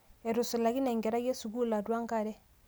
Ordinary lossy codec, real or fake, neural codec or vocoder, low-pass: none; real; none; none